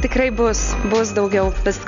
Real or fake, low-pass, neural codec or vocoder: real; 7.2 kHz; none